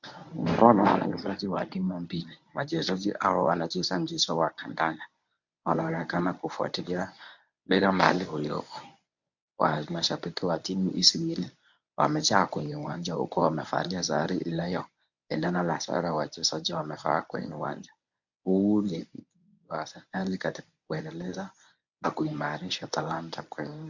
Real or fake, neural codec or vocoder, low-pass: fake; codec, 24 kHz, 0.9 kbps, WavTokenizer, medium speech release version 1; 7.2 kHz